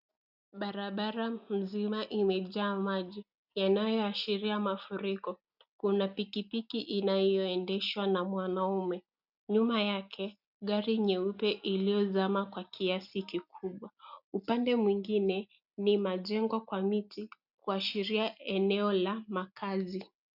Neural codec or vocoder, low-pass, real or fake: none; 5.4 kHz; real